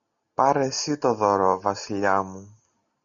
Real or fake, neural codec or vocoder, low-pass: real; none; 7.2 kHz